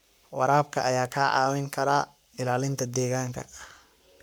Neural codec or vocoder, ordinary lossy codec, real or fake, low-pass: codec, 44.1 kHz, 7.8 kbps, Pupu-Codec; none; fake; none